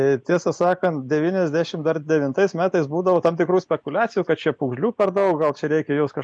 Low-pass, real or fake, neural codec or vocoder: 9.9 kHz; real; none